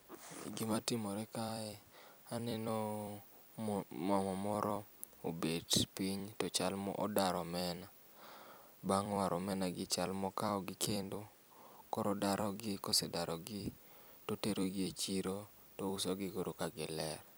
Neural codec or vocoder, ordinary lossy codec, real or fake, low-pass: vocoder, 44.1 kHz, 128 mel bands every 256 samples, BigVGAN v2; none; fake; none